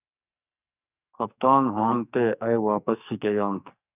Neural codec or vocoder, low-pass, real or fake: codec, 44.1 kHz, 2.6 kbps, SNAC; 3.6 kHz; fake